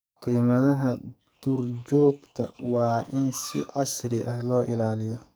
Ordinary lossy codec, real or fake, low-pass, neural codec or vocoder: none; fake; none; codec, 44.1 kHz, 2.6 kbps, SNAC